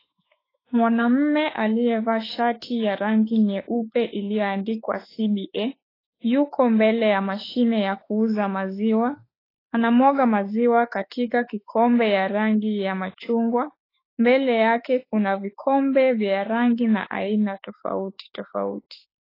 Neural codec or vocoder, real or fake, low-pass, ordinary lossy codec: autoencoder, 48 kHz, 32 numbers a frame, DAC-VAE, trained on Japanese speech; fake; 5.4 kHz; AAC, 24 kbps